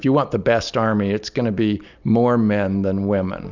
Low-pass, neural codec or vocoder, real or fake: 7.2 kHz; none; real